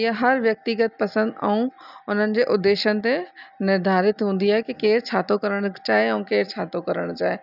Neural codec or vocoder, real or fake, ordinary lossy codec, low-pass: none; real; none; 5.4 kHz